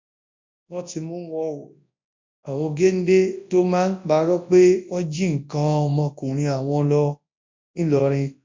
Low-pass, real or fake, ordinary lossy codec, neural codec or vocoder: 7.2 kHz; fake; MP3, 48 kbps; codec, 24 kHz, 0.9 kbps, WavTokenizer, large speech release